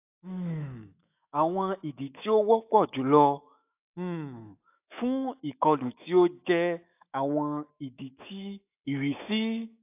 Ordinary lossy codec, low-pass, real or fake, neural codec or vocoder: AAC, 32 kbps; 3.6 kHz; fake; autoencoder, 48 kHz, 128 numbers a frame, DAC-VAE, trained on Japanese speech